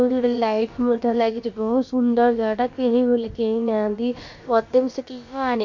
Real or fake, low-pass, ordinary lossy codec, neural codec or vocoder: fake; 7.2 kHz; MP3, 48 kbps; codec, 16 kHz, about 1 kbps, DyCAST, with the encoder's durations